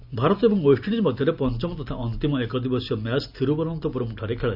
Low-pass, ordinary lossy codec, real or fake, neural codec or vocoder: 5.4 kHz; none; real; none